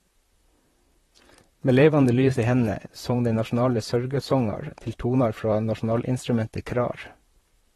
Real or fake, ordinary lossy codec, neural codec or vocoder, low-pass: fake; AAC, 32 kbps; vocoder, 44.1 kHz, 128 mel bands, Pupu-Vocoder; 19.8 kHz